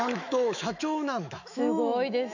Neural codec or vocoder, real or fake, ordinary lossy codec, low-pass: autoencoder, 48 kHz, 128 numbers a frame, DAC-VAE, trained on Japanese speech; fake; none; 7.2 kHz